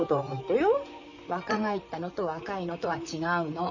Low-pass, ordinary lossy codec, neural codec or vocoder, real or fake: 7.2 kHz; none; vocoder, 44.1 kHz, 128 mel bands, Pupu-Vocoder; fake